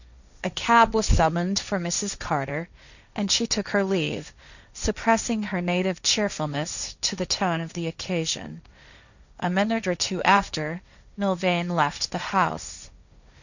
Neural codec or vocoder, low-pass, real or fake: codec, 16 kHz, 1.1 kbps, Voila-Tokenizer; 7.2 kHz; fake